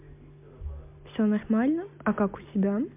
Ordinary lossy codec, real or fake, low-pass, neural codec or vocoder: MP3, 32 kbps; real; 3.6 kHz; none